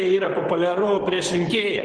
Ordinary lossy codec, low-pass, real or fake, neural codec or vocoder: Opus, 16 kbps; 9.9 kHz; fake; vocoder, 44.1 kHz, 128 mel bands, Pupu-Vocoder